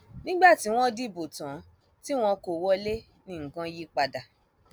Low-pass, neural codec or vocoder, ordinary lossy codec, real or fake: none; none; none; real